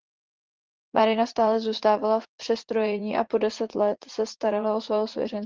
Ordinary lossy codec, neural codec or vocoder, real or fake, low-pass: Opus, 16 kbps; vocoder, 44.1 kHz, 80 mel bands, Vocos; fake; 7.2 kHz